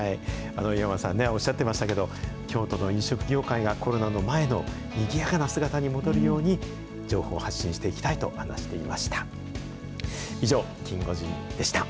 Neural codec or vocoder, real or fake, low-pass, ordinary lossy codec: none; real; none; none